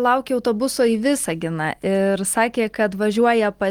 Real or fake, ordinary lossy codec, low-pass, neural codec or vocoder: real; Opus, 24 kbps; 19.8 kHz; none